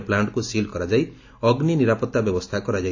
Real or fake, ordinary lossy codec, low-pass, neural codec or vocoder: real; AAC, 48 kbps; 7.2 kHz; none